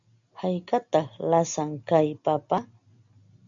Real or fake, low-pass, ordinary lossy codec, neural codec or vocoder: real; 7.2 kHz; AAC, 64 kbps; none